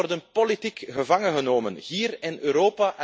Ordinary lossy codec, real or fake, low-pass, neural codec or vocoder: none; real; none; none